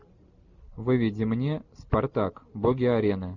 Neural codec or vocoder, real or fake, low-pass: none; real; 7.2 kHz